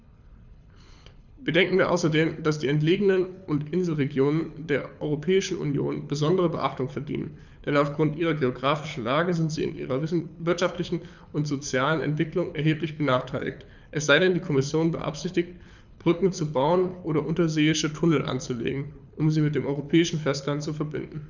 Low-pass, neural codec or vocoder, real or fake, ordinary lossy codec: 7.2 kHz; codec, 24 kHz, 6 kbps, HILCodec; fake; none